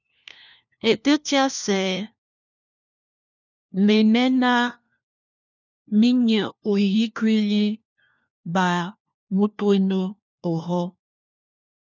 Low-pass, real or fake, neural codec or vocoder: 7.2 kHz; fake; codec, 16 kHz, 1 kbps, FunCodec, trained on LibriTTS, 50 frames a second